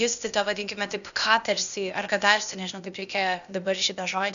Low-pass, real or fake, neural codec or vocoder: 7.2 kHz; fake; codec, 16 kHz, 0.8 kbps, ZipCodec